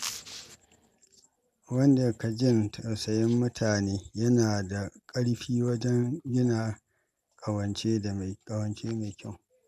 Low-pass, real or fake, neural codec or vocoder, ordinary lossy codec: 14.4 kHz; real; none; MP3, 96 kbps